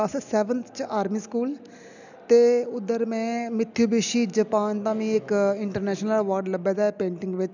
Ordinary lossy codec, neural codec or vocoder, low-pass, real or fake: none; none; 7.2 kHz; real